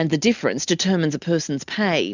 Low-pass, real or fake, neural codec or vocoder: 7.2 kHz; real; none